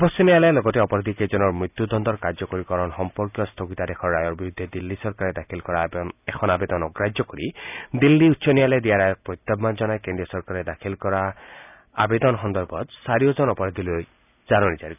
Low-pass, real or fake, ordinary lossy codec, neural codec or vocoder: 3.6 kHz; real; none; none